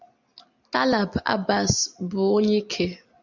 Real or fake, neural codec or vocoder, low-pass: real; none; 7.2 kHz